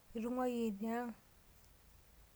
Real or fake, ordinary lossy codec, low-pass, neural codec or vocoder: real; none; none; none